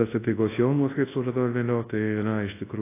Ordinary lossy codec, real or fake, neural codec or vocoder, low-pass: AAC, 16 kbps; fake; codec, 24 kHz, 0.9 kbps, WavTokenizer, large speech release; 3.6 kHz